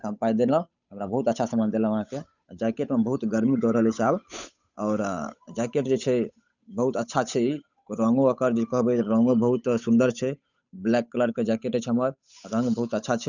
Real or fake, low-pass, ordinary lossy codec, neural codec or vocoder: fake; 7.2 kHz; none; codec, 16 kHz, 8 kbps, FunCodec, trained on Chinese and English, 25 frames a second